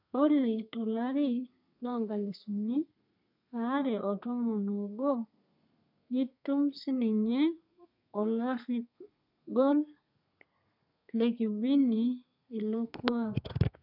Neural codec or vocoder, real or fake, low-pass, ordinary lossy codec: codec, 32 kHz, 1.9 kbps, SNAC; fake; 5.4 kHz; none